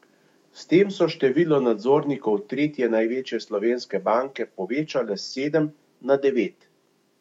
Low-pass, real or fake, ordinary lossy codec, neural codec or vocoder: 19.8 kHz; fake; MP3, 64 kbps; autoencoder, 48 kHz, 128 numbers a frame, DAC-VAE, trained on Japanese speech